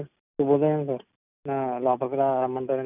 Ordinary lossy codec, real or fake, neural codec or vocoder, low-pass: none; real; none; 3.6 kHz